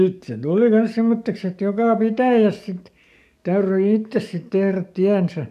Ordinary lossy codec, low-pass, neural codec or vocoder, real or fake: none; 14.4 kHz; codec, 44.1 kHz, 7.8 kbps, DAC; fake